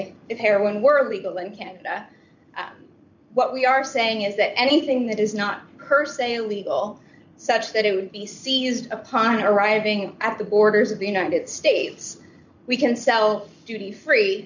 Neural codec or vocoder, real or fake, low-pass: none; real; 7.2 kHz